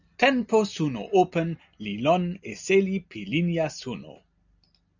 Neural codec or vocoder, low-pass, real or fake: none; 7.2 kHz; real